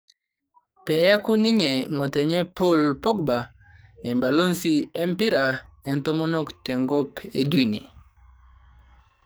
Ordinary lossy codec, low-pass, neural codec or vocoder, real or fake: none; none; codec, 44.1 kHz, 2.6 kbps, SNAC; fake